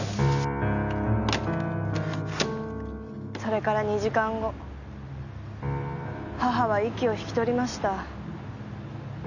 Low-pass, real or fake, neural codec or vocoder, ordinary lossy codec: 7.2 kHz; real; none; none